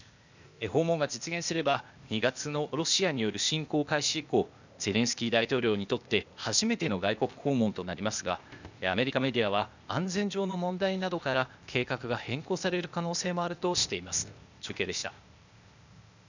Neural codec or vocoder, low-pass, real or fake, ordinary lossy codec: codec, 16 kHz, 0.8 kbps, ZipCodec; 7.2 kHz; fake; none